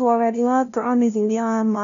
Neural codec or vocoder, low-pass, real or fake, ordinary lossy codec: codec, 16 kHz, 0.5 kbps, FunCodec, trained on LibriTTS, 25 frames a second; 7.2 kHz; fake; none